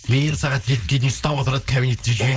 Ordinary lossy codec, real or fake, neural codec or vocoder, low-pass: none; fake; codec, 16 kHz, 4.8 kbps, FACodec; none